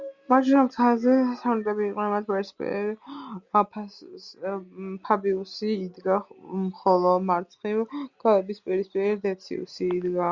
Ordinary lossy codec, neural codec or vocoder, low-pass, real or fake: Opus, 64 kbps; none; 7.2 kHz; real